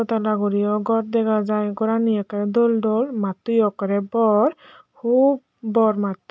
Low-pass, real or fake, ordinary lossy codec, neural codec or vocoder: none; real; none; none